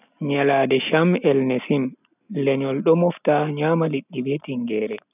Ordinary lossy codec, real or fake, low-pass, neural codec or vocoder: none; real; 3.6 kHz; none